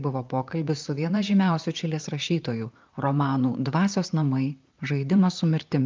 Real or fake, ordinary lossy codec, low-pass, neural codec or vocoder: fake; Opus, 24 kbps; 7.2 kHz; vocoder, 44.1 kHz, 128 mel bands, Pupu-Vocoder